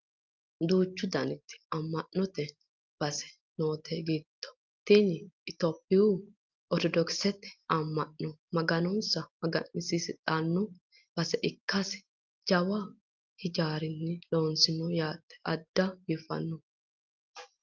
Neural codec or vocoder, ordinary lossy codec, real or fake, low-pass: none; Opus, 24 kbps; real; 7.2 kHz